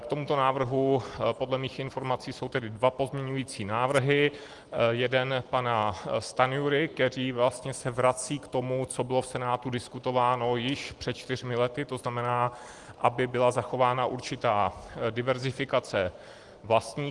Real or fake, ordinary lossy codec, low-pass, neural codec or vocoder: real; Opus, 24 kbps; 10.8 kHz; none